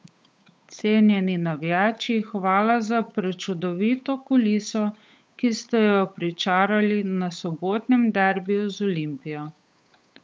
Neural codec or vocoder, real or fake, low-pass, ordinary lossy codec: codec, 16 kHz, 8 kbps, FunCodec, trained on Chinese and English, 25 frames a second; fake; none; none